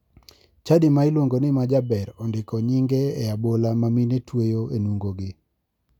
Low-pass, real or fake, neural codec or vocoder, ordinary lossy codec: 19.8 kHz; real; none; none